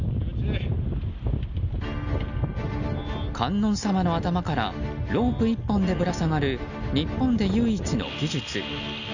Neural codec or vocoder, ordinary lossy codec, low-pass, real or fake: none; none; 7.2 kHz; real